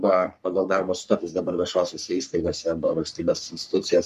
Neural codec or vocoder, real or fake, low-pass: codec, 44.1 kHz, 3.4 kbps, Pupu-Codec; fake; 14.4 kHz